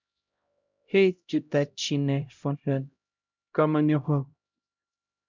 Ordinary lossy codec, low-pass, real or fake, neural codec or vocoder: MP3, 64 kbps; 7.2 kHz; fake; codec, 16 kHz, 0.5 kbps, X-Codec, HuBERT features, trained on LibriSpeech